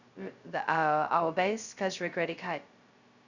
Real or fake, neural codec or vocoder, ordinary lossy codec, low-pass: fake; codec, 16 kHz, 0.2 kbps, FocalCodec; Opus, 64 kbps; 7.2 kHz